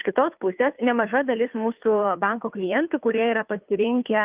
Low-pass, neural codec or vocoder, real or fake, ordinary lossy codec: 3.6 kHz; codec, 16 kHz, 2 kbps, FunCodec, trained on Chinese and English, 25 frames a second; fake; Opus, 24 kbps